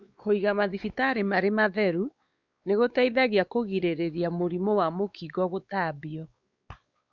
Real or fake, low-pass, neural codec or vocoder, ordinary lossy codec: fake; 7.2 kHz; codec, 16 kHz, 4 kbps, X-Codec, WavLM features, trained on Multilingual LibriSpeech; Opus, 64 kbps